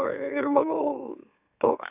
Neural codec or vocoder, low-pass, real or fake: autoencoder, 44.1 kHz, a latent of 192 numbers a frame, MeloTTS; 3.6 kHz; fake